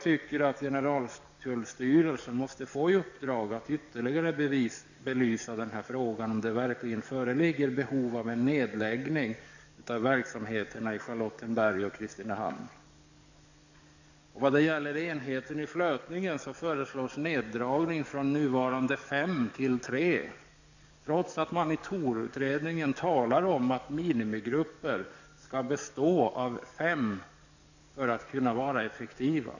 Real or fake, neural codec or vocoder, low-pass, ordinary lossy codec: fake; codec, 44.1 kHz, 7.8 kbps, DAC; 7.2 kHz; none